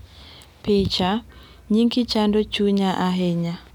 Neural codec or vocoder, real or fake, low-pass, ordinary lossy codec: none; real; 19.8 kHz; none